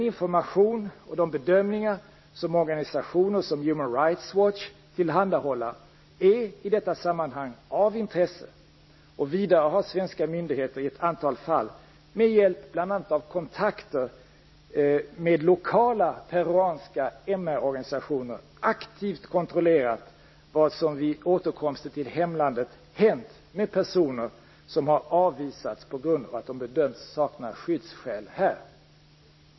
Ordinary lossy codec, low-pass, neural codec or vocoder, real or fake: MP3, 24 kbps; 7.2 kHz; none; real